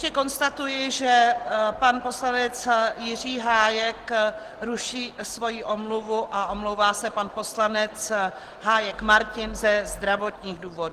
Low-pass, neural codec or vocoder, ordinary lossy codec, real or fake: 14.4 kHz; none; Opus, 16 kbps; real